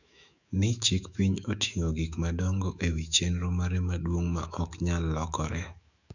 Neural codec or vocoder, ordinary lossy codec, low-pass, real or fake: autoencoder, 48 kHz, 128 numbers a frame, DAC-VAE, trained on Japanese speech; none; 7.2 kHz; fake